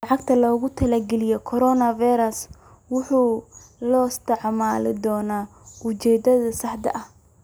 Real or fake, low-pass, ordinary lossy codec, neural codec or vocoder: real; none; none; none